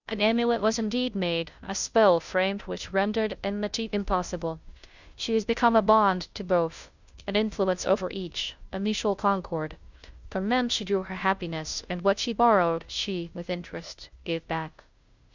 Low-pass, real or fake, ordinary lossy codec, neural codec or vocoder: 7.2 kHz; fake; Opus, 64 kbps; codec, 16 kHz, 0.5 kbps, FunCodec, trained on Chinese and English, 25 frames a second